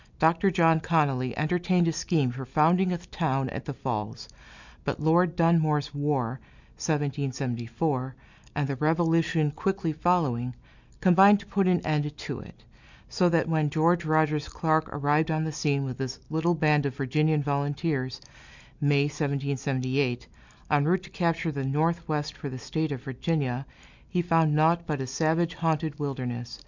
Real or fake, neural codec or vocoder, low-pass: real; none; 7.2 kHz